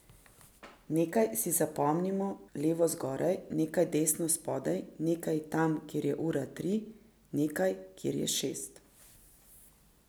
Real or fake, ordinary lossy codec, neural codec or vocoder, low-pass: real; none; none; none